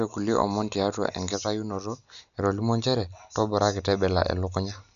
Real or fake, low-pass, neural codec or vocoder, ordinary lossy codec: real; 7.2 kHz; none; none